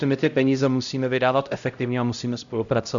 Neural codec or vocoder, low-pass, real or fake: codec, 16 kHz, 0.5 kbps, X-Codec, HuBERT features, trained on LibriSpeech; 7.2 kHz; fake